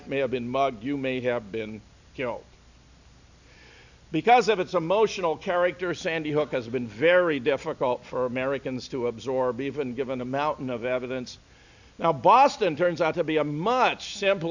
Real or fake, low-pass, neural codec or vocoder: real; 7.2 kHz; none